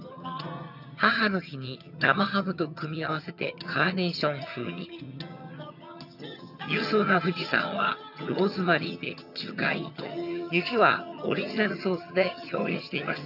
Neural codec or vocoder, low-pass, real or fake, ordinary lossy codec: vocoder, 22.05 kHz, 80 mel bands, HiFi-GAN; 5.4 kHz; fake; none